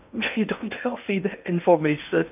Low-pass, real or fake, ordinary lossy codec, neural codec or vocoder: 3.6 kHz; fake; none; codec, 16 kHz in and 24 kHz out, 0.6 kbps, FocalCodec, streaming, 2048 codes